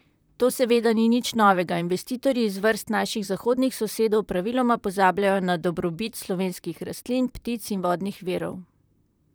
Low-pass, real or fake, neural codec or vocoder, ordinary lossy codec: none; fake; vocoder, 44.1 kHz, 128 mel bands, Pupu-Vocoder; none